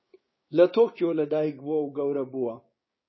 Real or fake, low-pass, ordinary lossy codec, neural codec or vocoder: fake; 7.2 kHz; MP3, 24 kbps; codec, 16 kHz, 6 kbps, DAC